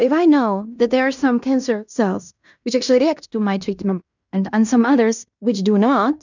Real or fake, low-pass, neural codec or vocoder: fake; 7.2 kHz; codec, 16 kHz in and 24 kHz out, 0.9 kbps, LongCat-Audio-Codec, fine tuned four codebook decoder